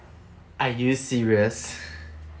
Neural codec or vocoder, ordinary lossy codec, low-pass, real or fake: none; none; none; real